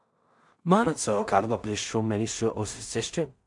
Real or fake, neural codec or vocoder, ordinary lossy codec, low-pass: fake; codec, 16 kHz in and 24 kHz out, 0.4 kbps, LongCat-Audio-Codec, two codebook decoder; MP3, 96 kbps; 10.8 kHz